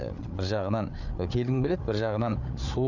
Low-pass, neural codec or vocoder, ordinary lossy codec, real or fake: 7.2 kHz; codec, 16 kHz, 8 kbps, FreqCodec, larger model; none; fake